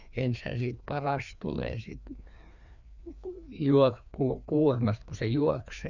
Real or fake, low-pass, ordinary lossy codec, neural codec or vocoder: fake; 7.2 kHz; none; codec, 16 kHz, 2 kbps, FreqCodec, larger model